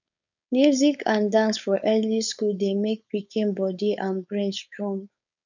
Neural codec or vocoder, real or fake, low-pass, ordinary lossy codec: codec, 16 kHz, 4.8 kbps, FACodec; fake; 7.2 kHz; none